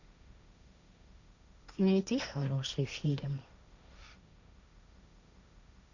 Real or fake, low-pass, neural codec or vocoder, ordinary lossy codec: fake; 7.2 kHz; codec, 16 kHz, 1.1 kbps, Voila-Tokenizer; none